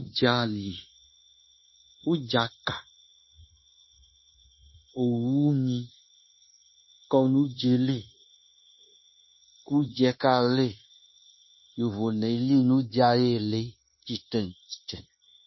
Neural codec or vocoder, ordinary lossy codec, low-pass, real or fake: codec, 16 kHz, 0.9 kbps, LongCat-Audio-Codec; MP3, 24 kbps; 7.2 kHz; fake